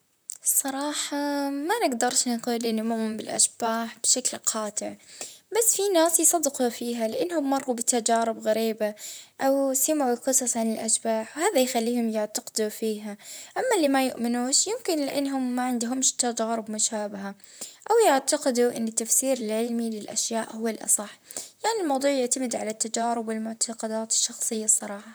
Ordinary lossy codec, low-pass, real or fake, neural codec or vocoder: none; none; fake; vocoder, 44.1 kHz, 128 mel bands, Pupu-Vocoder